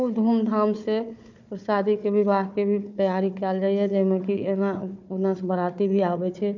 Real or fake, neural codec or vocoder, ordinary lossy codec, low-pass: fake; codec, 16 kHz, 4 kbps, FunCodec, trained on Chinese and English, 50 frames a second; none; 7.2 kHz